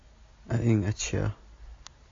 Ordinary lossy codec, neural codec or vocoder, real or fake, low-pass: AAC, 32 kbps; none; real; 7.2 kHz